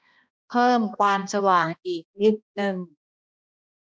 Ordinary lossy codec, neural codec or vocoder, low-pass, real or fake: none; codec, 16 kHz, 1 kbps, X-Codec, HuBERT features, trained on balanced general audio; none; fake